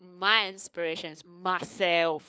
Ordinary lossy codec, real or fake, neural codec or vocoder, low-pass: none; fake; codec, 16 kHz, 4 kbps, FunCodec, trained on LibriTTS, 50 frames a second; none